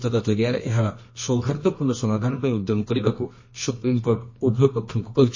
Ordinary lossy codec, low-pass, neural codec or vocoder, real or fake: MP3, 32 kbps; 7.2 kHz; codec, 24 kHz, 0.9 kbps, WavTokenizer, medium music audio release; fake